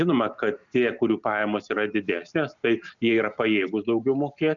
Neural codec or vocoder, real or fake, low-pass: none; real; 7.2 kHz